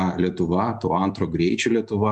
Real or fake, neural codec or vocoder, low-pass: real; none; 10.8 kHz